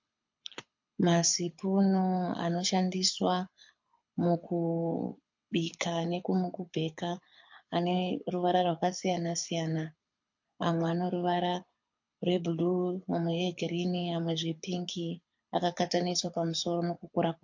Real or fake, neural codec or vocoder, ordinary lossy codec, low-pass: fake; codec, 24 kHz, 6 kbps, HILCodec; MP3, 48 kbps; 7.2 kHz